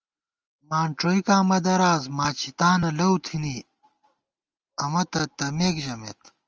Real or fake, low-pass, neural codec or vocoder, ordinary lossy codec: real; 7.2 kHz; none; Opus, 24 kbps